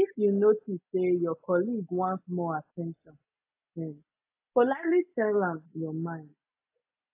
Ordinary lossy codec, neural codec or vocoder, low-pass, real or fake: AAC, 32 kbps; none; 3.6 kHz; real